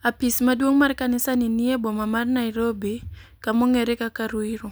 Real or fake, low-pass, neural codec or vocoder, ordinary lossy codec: real; none; none; none